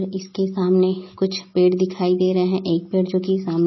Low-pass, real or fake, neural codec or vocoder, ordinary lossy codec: 7.2 kHz; real; none; MP3, 24 kbps